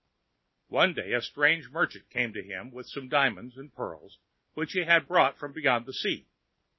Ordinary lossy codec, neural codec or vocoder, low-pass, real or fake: MP3, 24 kbps; none; 7.2 kHz; real